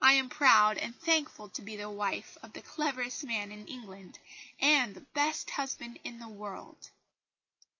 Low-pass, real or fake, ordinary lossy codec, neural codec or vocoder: 7.2 kHz; real; MP3, 32 kbps; none